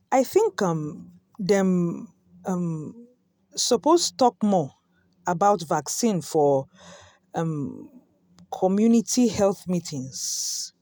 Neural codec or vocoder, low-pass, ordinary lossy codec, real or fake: none; none; none; real